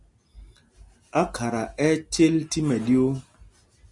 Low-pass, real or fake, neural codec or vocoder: 10.8 kHz; real; none